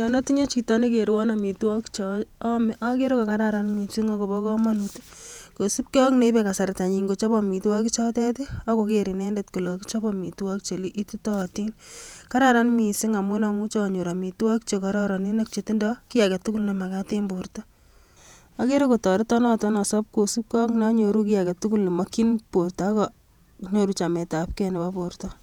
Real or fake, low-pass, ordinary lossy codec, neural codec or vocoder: fake; 19.8 kHz; none; vocoder, 48 kHz, 128 mel bands, Vocos